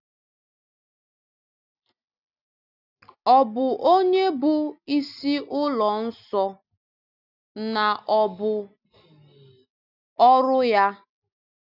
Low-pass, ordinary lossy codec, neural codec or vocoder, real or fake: 5.4 kHz; none; none; real